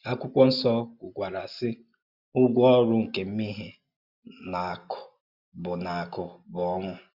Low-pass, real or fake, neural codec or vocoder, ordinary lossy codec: 5.4 kHz; real; none; Opus, 64 kbps